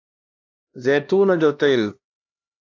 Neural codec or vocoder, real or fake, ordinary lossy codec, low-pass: codec, 16 kHz, 1 kbps, X-Codec, HuBERT features, trained on LibriSpeech; fake; AAC, 48 kbps; 7.2 kHz